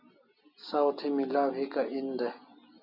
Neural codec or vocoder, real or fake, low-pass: none; real; 5.4 kHz